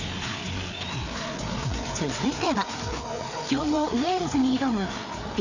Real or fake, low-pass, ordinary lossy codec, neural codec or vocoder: fake; 7.2 kHz; none; codec, 16 kHz, 4 kbps, FreqCodec, larger model